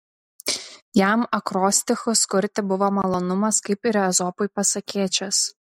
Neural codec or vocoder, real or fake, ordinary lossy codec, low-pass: none; real; MP3, 64 kbps; 19.8 kHz